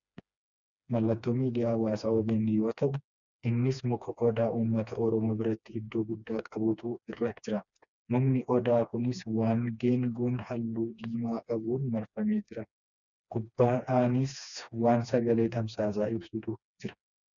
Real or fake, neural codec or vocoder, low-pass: fake; codec, 16 kHz, 2 kbps, FreqCodec, smaller model; 7.2 kHz